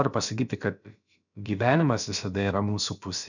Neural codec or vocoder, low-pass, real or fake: codec, 16 kHz, about 1 kbps, DyCAST, with the encoder's durations; 7.2 kHz; fake